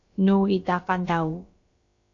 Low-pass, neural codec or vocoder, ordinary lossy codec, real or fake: 7.2 kHz; codec, 16 kHz, about 1 kbps, DyCAST, with the encoder's durations; AAC, 48 kbps; fake